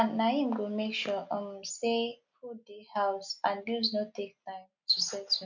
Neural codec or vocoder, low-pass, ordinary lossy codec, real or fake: none; 7.2 kHz; none; real